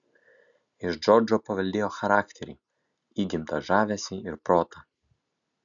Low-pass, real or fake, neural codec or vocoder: 7.2 kHz; real; none